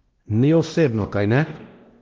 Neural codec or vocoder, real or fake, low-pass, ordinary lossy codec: codec, 16 kHz, 1 kbps, X-Codec, WavLM features, trained on Multilingual LibriSpeech; fake; 7.2 kHz; Opus, 16 kbps